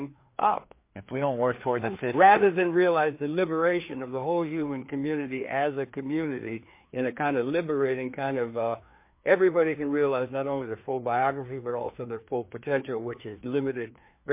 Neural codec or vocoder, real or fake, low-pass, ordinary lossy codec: codec, 16 kHz, 2 kbps, FreqCodec, larger model; fake; 3.6 kHz; MP3, 24 kbps